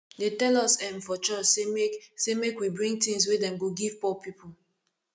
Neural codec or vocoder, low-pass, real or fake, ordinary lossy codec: none; none; real; none